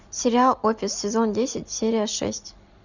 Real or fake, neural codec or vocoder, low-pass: real; none; 7.2 kHz